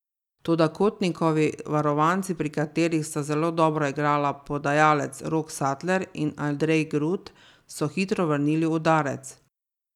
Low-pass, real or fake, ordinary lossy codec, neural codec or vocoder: 19.8 kHz; real; none; none